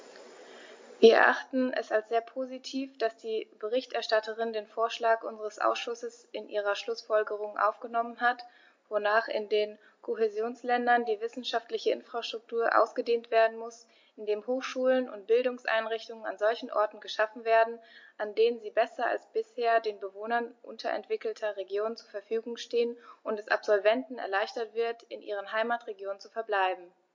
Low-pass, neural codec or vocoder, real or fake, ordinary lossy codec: 7.2 kHz; none; real; MP3, 48 kbps